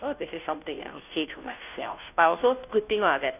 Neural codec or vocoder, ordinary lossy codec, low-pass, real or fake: codec, 16 kHz, 0.5 kbps, FunCodec, trained on Chinese and English, 25 frames a second; none; 3.6 kHz; fake